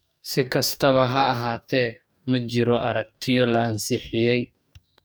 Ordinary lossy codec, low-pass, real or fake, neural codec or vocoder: none; none; fake; codec, 44.1 kHz, 2.6 kbps, DAC